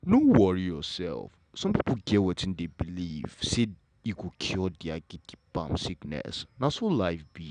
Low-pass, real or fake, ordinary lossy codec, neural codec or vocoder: 9.9 kHz; real; none; none